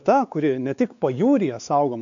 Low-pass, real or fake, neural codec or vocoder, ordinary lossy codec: 7.2 kHz; fake; codec, 16 kHz, 4 kbps, X-Codec, WavLM features, trained on Multilingual LibriSpeech; AAC, 64 kbps